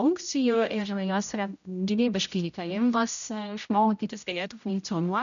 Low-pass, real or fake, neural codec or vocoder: 7.2 kHz; fake; codec, 16 kHz, 0.5 kbps, X-Codec, HuBERT features, trained on general audio